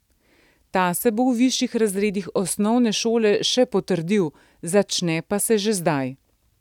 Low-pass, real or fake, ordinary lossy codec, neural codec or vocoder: 19.8 kHz; real; none; none